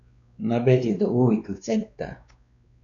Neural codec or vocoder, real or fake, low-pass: codec, 16 kHz, 2 kbps, X-Codec, WavLM features, trained on Multilingual LibriSpeech; fake; 7.2 kHz